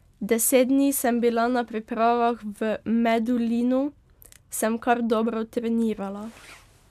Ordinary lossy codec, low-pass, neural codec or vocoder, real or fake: MP3, 96 kbps; 14.4 kHz; none; real